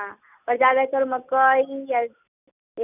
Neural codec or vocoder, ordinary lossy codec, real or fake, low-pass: none; none; real; 3.6 kHz